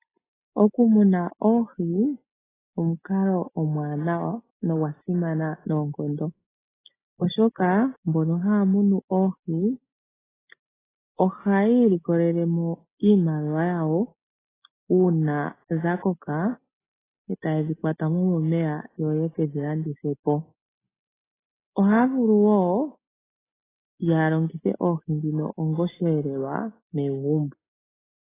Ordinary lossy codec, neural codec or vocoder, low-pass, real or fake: AAC, 16 kbps; none; 3.6 kHz; real